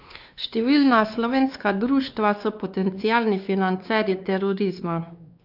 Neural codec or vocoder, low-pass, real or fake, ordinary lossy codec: codec, 16 kHz, 2 kbps, X-Codec, WavLM features, trained on Multilingual LibriSpeech; 5.4 kHz; fake; none